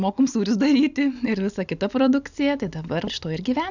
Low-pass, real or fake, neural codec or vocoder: 7.2 kHz; real; none